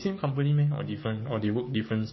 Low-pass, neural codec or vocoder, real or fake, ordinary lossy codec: 7.2 kHz; codec, 16 kHz, 6 kbps, DAC; fake; MP3, 24 kbps